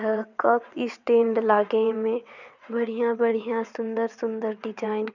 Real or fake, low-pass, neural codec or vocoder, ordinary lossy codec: fake; 7.2 kHz; vocoder, 22.05 kHz, 80 mel bands, WaveNeXt; none